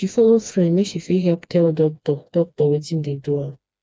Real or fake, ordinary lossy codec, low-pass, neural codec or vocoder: fake; none; none; codec, 16 kHz, 2 kbps, FreqCodec, smaller model